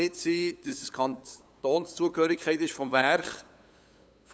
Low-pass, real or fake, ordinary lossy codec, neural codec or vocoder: none; fake; none; codec, 16 kHz, 8 kbps, FunCodec, trained on LibriTTS, 25 frames a second